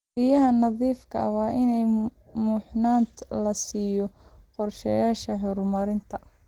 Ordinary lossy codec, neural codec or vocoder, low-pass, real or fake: Opus, 16 kbps; none; 19.8 kHz; real